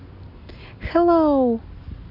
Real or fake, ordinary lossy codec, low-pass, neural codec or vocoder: real; none; 5.4 kHz; none